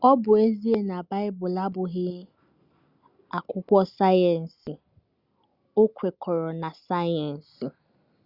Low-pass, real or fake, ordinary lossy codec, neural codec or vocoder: 5.4 kHz; real; Opus, 64 kbps; none